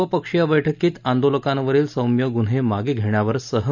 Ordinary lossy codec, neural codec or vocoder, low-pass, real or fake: none; none; 7.2 kHz; real